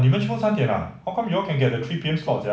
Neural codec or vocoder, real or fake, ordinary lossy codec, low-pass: none; real; none; none